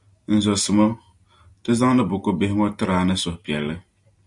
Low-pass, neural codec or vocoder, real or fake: 10.8 kHz; none; real